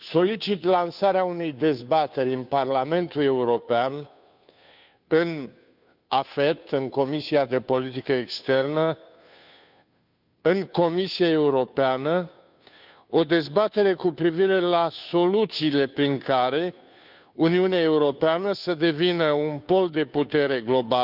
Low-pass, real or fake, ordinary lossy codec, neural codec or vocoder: 5.4 kHz; fake; none; codec, 16 kHz, 2 kbps, FunCodec, trained on Chinese and English, 25 frames a second